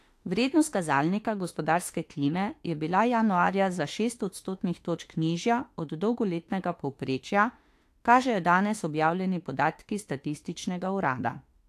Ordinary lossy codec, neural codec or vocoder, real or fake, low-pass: AAC, 64 kbps; autoencoder, 48 kHz, 32 numbers a frame, DAC-VAE, trained on Japanese speech; fake; 14.4 kHz